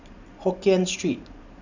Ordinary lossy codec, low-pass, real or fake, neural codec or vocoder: none; 7.2 kHz; fake; vocoder, 44.1 kHz, 128 mel bands every 512 samples, BigVGAN v2